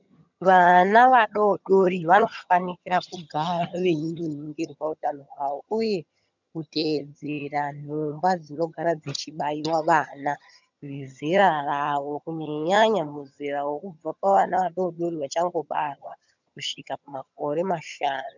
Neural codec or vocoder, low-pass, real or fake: vocoder, 22.05 kHz, 80 mel bands, HiFi-GAN; 7.2 kHz; fake